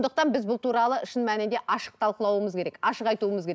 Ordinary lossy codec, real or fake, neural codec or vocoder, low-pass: none; real; none; none